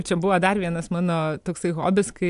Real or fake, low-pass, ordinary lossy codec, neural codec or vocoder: real; 10.8 kHz; AAC, 96 kbps; none